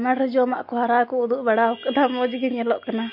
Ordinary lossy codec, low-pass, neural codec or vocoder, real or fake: MP3, 32 kbps; 5.4 kHz; none; real